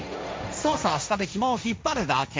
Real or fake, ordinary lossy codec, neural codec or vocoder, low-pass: fake; none; codec, 16 kHz, 1.1 kbps, Voila-Tokenizer; none